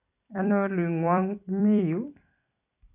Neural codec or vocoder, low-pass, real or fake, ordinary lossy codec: vocoder, 44.1 kHz, 128 mel bands every 256 samples, BigVGAN v2; 3.6 kHz; fake; none